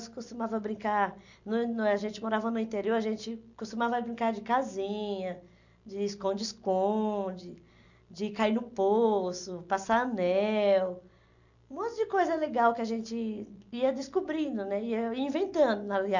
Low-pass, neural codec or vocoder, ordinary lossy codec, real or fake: 7.2 kHz; none; none; real